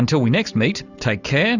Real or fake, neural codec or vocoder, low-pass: real; none; 7.2 kHz